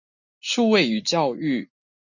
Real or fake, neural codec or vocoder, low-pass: real; none; 7.2 kHz